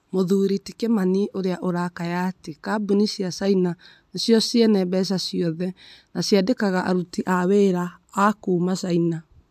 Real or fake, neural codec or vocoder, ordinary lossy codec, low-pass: real; none; AAC, 96 kbps; 14.4 kHz